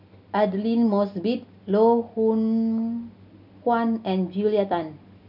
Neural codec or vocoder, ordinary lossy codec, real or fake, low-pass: none; none; real; 5.4 kHz